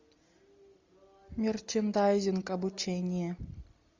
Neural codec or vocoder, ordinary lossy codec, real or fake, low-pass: none; MP3, 64 kbps; real; 7.2 kHz